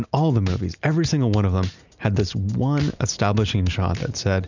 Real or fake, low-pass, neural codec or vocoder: real; 7.2 kHz; none